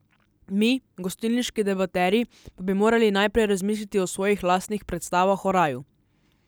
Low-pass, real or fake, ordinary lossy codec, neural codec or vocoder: none; real; none; none